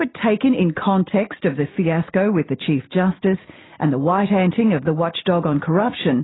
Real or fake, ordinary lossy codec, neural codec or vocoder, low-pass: real; AAC, 16 kbps; none; 7.2 kHz